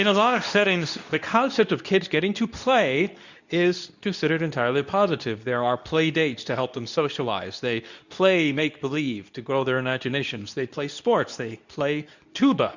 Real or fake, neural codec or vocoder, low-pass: fake; codec, 24 kHz, 0.9 kbps, WavTokenizer, medium speech release version 2; 7.2 kHz